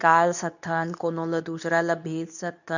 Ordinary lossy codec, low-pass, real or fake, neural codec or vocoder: none; 7.2 kHz; fake; codec, 24 kHz, 0.9 kbps, WavTokenizer, medium speech release version 2